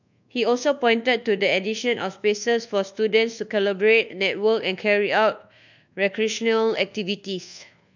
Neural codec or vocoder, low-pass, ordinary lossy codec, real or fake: codec, 24 kHz, 1.2 kbps, DualCodec; 7.2 kHz; none; fake